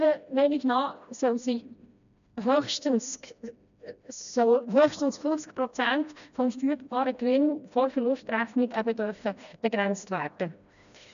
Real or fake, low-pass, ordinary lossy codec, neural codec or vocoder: fake; 7.2 kHz; none; codec, 16 kHz, 1 kbps, FreqCodec, smaller model